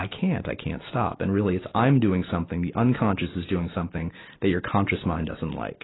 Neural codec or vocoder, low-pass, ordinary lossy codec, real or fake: none; 7.2 kHz; AAC, 16 kbps; real